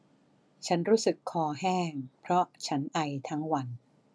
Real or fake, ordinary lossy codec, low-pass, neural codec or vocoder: real; none; none; none